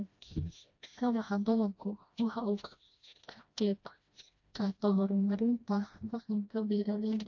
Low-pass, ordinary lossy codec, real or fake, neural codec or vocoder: 7.2 kHz; AAC, 48 kbps; fake; codec, 16 kHz, 1 kbps, FreqCodec, smaller model